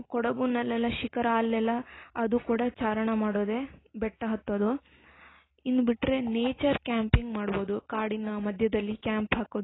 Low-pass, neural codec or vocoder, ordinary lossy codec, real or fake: 7.2 kHz; none; AAC, 16 kbps; real